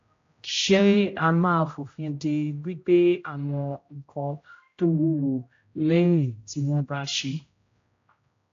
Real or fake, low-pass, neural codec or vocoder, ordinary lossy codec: fake; 7.2 kHz; codec, 16 kHz, 0.5 kbps, X-Codec, HuBERT features, trained on general audio; AAC, 48 kbps